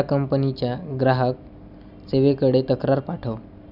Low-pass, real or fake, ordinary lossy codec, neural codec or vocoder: 5.4 kHz; real; none; none